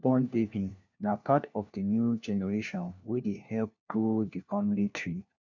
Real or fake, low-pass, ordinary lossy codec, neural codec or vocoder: fake; 7.2 kHz; none; codec, 16 kHz, 1 kbps, FunCodec, trained on LibriTTS, 50 frames a second